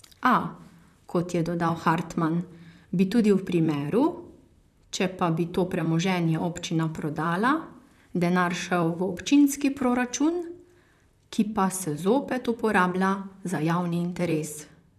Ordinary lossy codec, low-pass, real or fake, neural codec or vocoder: none; 14.4 kHz; fake; vocoder, 44.1 kHz, 128 mel bands, Pupu-Vocoder